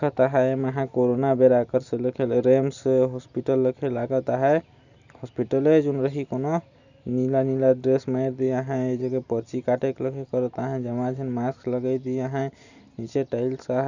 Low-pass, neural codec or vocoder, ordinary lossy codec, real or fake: 7.2 kHz; none; none; real